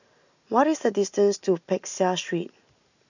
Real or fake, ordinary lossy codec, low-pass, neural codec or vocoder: real; none; 7.2 kHz; none